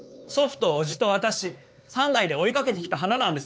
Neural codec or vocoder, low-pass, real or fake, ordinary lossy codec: codec, 16 kHz, 4 kbps, X-Codec, HuBERT features, trained on LibriSpeech; none; fake; none